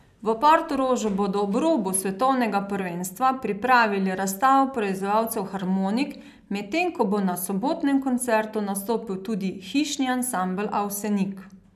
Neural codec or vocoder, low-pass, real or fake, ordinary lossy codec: none; 14.4 kHz; real; none